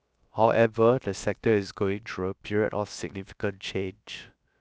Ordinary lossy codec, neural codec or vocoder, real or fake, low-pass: none; codec, 16 kHz, 0.7 kbps, FocalCodec; fake; none